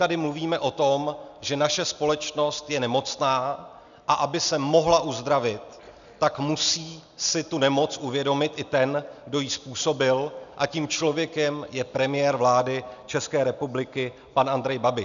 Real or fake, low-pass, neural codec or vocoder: real; 7.2 kHz; none